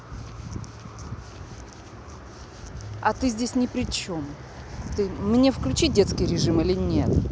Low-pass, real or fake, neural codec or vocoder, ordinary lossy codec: none; real; none; none